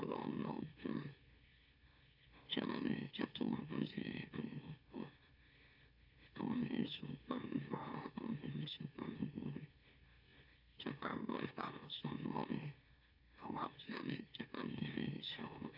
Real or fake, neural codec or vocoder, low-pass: fake; autoencoder, 44.1 kHz, a latent of 192 numbers a frame, MeloTTS; 5.4 kHz